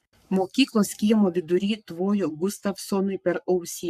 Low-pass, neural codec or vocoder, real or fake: 14.4 kHz; codec, 44.1 kHz, 7.8 kbps, Pupu-Codec; fake